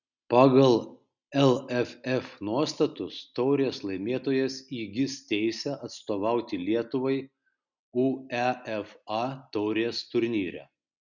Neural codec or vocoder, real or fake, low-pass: none; real; 7.2 kHz